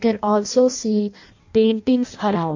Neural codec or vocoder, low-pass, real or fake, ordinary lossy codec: codec, 16 kHz in and 24 kHz out, 0.6 kbps, FireRedTTS-2 codec; 7.2 kHz; fake; AAC, 48 kbps